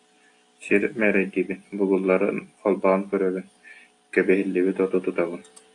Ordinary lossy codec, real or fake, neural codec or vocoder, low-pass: AAC, 48 kbps; real; none; 10.8 kHz